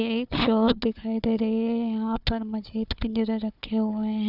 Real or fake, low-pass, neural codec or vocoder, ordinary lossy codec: fake; 5.4 kHz; codec, 16 kHz, 4 kbps, FunCodec, trained on Chinese and English, 50 frames a second; none